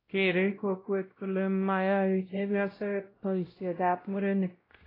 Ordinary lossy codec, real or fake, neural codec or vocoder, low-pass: AAC, 24 kbps; fake; codec, 16 kHz, 0.5 kbps, X-Codec, WavLM features, trained on Multilingual LibriSpeech; 5.4 kHz